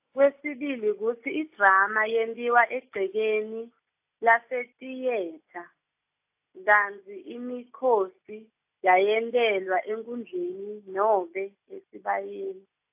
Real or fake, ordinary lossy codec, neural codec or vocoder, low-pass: real; AAC, 32 kbps; none; 3.6 kHz